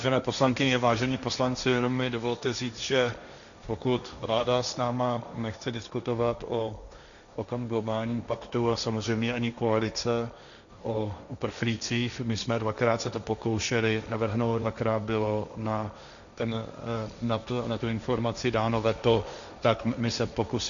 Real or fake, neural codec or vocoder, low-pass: fake; codec, 16 kHz, 1.1 kbps, Voila-Tokenizer; 7.2 kHz